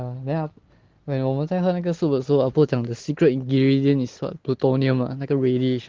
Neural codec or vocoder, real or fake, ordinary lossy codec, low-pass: none; real; Opus, 16 kbps; 7.2 kHz